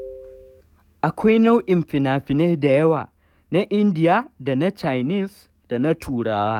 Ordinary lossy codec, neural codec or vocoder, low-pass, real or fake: none; codec, 44.1 kHz, 7.8 kbps, DAC; 19.8 kHz; fake